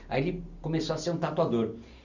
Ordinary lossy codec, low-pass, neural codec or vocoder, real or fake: none; 7.2 kHz; none; real